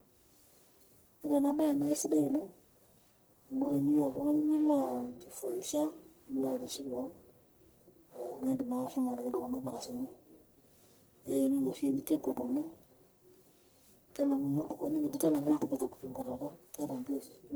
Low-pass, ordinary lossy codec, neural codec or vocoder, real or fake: none; none; codec, 44.1 kHz, 1.7 kbps, Pupu-Codec; fake